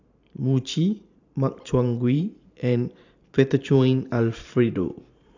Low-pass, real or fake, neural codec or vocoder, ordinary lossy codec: 7.2 kHz; fake; vocoder, 44.1 kHz, 128 mel bands every 512 samples, BigVGAN v2; AAC, 48 kbps